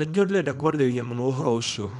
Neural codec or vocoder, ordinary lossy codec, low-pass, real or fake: codec, 24 kHz, 0.9 kbps, WavTokenizer, small release; none; 10.8 kHz; fake